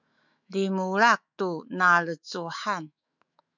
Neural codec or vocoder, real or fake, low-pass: autoencoder, 48 kHz, 128 numbers a frame, DAC-VAE, trained on Japanese speech; fake; 7.2 kHz